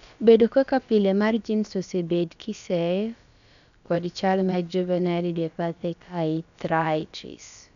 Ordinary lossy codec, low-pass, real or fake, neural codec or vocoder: none; 7.2 kHz; fake; codec, 16 kHz, about 1 kbps, DyCAST, with the encoder's durations